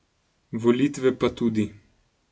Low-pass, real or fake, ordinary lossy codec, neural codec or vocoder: none; real; none; none